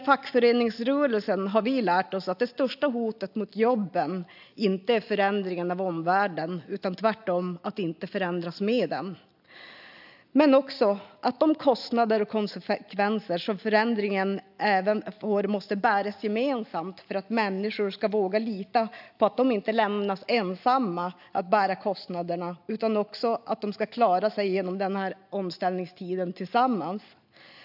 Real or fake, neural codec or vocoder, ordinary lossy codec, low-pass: real; none; AAC, 48 kbps; 5.4 kHz